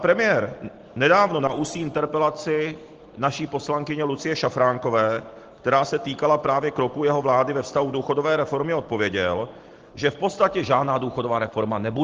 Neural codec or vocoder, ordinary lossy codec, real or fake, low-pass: none; Opus, 16 kbps; real; 7.2 kHz